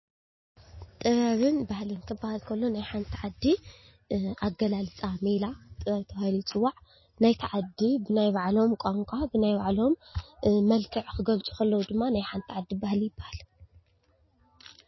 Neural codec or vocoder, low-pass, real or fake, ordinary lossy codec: none; 7.2 kHz; real; MP3, 24 kbps